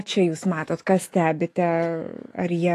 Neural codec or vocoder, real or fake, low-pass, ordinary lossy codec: autoencoder, 48 kHz, 128 numbers a frame, DAC-VAE, trained on Japanese speech; fake; 14.4 kHz; AAC, 48 kbps